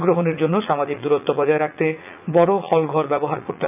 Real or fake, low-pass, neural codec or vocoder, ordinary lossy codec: fake; 3.6 kHz; vocoder, 44.1 kHz, 80 mel bands, Vocos; none